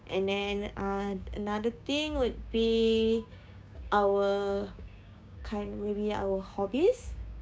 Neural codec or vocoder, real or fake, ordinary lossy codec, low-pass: codec, 16 kHz, 6 kbps, DAC; fake; none; none